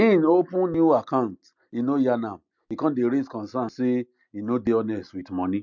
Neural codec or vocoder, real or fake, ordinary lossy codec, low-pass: none; real; MP3, 64 kbps; 7.2 kHz